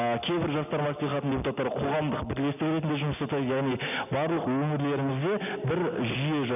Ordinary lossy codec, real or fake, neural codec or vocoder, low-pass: none; real; none; 3.6 kHz